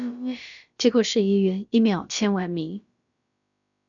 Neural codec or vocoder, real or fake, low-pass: codec, 16 kHz, about 1 kbps, DyCAST, with the encoder's durations; fake; 7.2 kHz